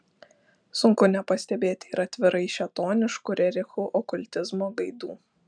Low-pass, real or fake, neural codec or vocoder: 9.9 kHz; real; none